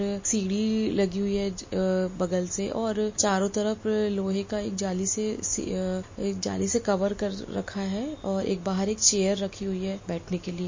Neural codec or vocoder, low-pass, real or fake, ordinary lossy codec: none; 7.2 kHz; real; MP3, 32 kbps